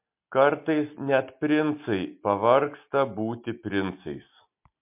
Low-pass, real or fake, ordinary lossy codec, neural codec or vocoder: 3.6 kHz; real; MP3, 32 kbps; none